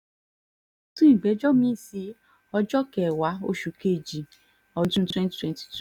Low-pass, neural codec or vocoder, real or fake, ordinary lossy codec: 19.8 kHz; none; real; none